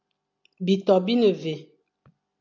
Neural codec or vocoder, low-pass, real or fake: none; 7.2 kHz; real